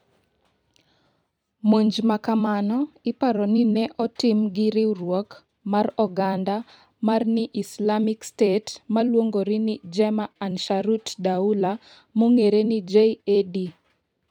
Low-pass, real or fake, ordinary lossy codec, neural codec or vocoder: 19.8 kHz; fake; none; vocoder, 44.1 kHz, 128 mel bands every 256 samples, BigVGAN v2